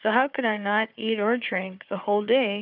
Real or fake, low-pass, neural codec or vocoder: fake; 5.4 kHz; codec, 16 kHz, 4 kbps, FunCodec, trained on Chinese and English, 50 frames a second